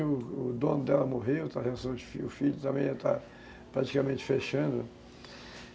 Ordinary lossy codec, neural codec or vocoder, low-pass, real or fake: none; none; none; real